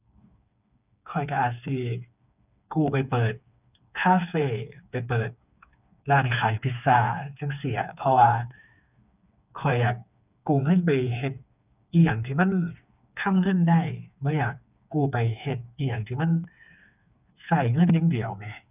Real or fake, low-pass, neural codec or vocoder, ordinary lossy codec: fake; 3.6 kHz; codec, 16 kHz, 4 kbps, FreqCodec, smaller model; none